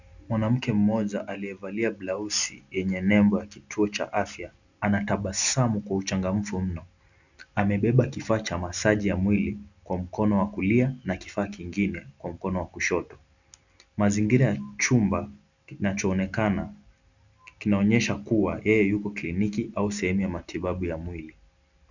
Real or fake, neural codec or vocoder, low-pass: real; none; 7.2 kHz